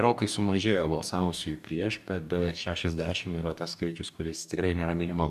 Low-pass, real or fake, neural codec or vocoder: 14.4 kHz; fake; codec, 44.1 kHz, 2.6 kbps, DAC